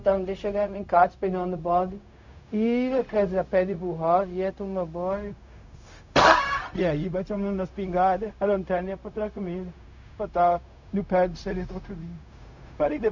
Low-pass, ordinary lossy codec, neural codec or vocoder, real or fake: 7.2 kHz; none; codec, 16 kHz, 0.4 kbps, LongCat-Audio-Codec; fake